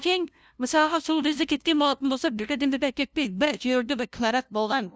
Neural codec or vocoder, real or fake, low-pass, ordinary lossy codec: codec, 16 kHz, 0.5 kbps, FunCodec, trained on LibriTTS, 25 frames a second; fake; none; none